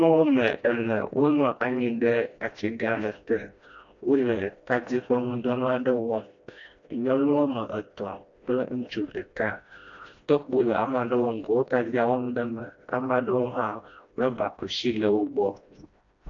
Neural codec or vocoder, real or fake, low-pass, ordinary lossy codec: codec, 16 kHz, 1 kbps, FreqCodec, smaller model; fake; 7.2 kHz; AAC, 48 kbps